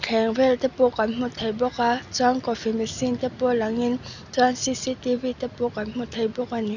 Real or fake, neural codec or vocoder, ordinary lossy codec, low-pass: real; none; none; 7.2 kHz